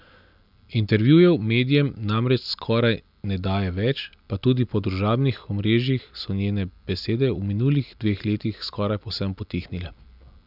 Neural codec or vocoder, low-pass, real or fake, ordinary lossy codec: none; 5.4 kHz; real; none